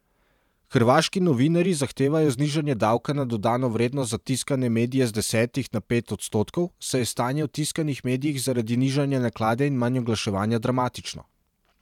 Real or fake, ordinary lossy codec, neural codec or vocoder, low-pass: fake; none; vocoder, 44.1 kHz, 128 mel bands every 512 samples, BigVGAN v2; 19.8 kHz